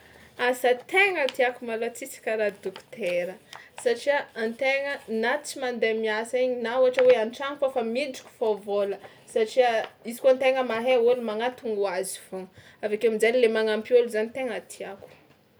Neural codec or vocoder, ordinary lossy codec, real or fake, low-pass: none; none; real; none